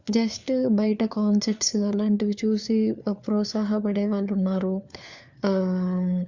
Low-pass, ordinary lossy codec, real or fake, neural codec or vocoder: 7.2 kHz; Opus, 64 kbps; fake; codec, 16 kHz, 4 kbps, FunCodec, trained on LibriTTS, 50 frames a second